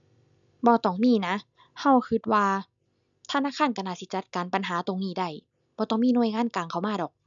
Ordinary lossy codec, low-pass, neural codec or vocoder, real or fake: none; 7.2 kHz; none; real